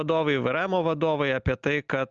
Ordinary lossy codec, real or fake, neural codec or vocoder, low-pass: Opus, 24 kbps; real; none; 7.2 kHz